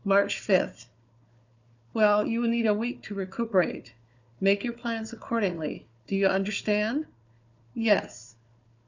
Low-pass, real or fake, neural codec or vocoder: 7.2 kHz; fake; codec, 16 kHz, 4 kbps, FunCodec, trained on Chinese and English, 50 frames a second